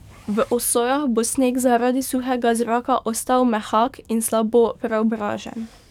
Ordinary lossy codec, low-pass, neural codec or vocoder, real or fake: none; 19.8 kHz; codec, 44.1 kHz, 7.8 kbps, DAC; fake